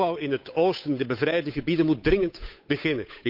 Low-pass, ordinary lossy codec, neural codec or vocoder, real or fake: 5.4 kHz; none; codec, 16 kHz, 8 kbps, FunCodec, trained on Chinese and English, 25 frames a second; fake